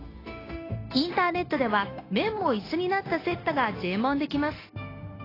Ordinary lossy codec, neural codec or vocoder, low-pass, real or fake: AAC, 24 kbps; codec, 16 kHz, 0.9 kbps, LongCat-Audio-Codec; 5.4 kHz; fake